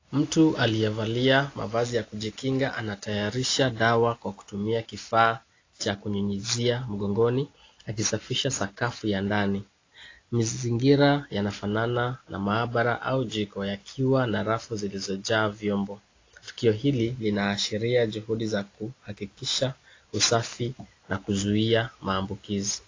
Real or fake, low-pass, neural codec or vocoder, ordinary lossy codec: real; 7.2 kHz; none; AAC, 32 kbps